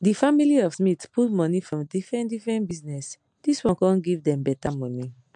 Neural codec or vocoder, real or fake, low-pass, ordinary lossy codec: none; real; 9.9 kHz; MP3, 64 kbps